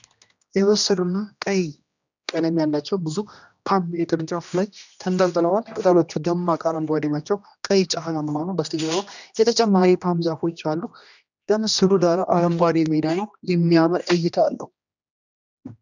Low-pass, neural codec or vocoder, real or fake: 7.2 kHz; codec, 16 kHz, 1 kbps, X-Codec, HuBERT features, trained on general audio; fake